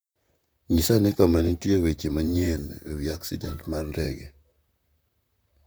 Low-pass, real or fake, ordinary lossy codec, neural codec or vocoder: none; fake; none; vocoder, 44.1 kHz, 128 mel bands, Pupu-Vocoder